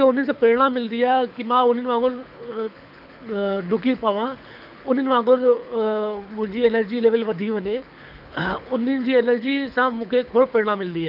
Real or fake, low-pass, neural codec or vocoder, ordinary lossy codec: fake; 5.4 kHz; codec, 24 kHz, 6 kbps, HILCodec; none